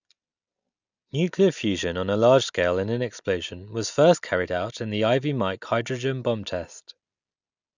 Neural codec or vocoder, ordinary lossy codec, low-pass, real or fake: none; none; 7.2 kHz; real